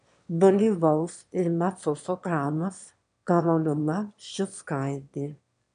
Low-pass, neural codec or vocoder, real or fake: 9.9 kHz; autoencoder, 22.05 kHz, a latent of 192 numbers a frame, VITS, trained on one speaker; fake